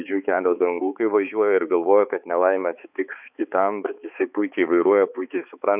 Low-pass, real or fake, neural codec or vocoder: 3.6 kHz; fake; codec, 16 kHz, 4 kbps, X-Codec, HuBERT features, trained on balanced general audio